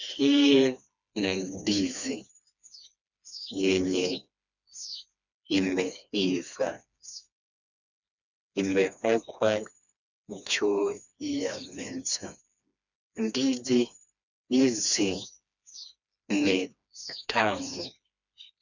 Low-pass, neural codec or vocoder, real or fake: 7.2 kHz; codec, 16 kHz, 2 kbps, FreqCodec, smaller model; fake